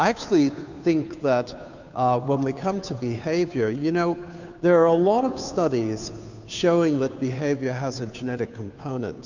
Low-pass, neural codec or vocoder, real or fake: 7.2 kHz; codec, 16 kHz, 2 kbps, FunCodec, trained on Chinese and English, 25 frames a second; fake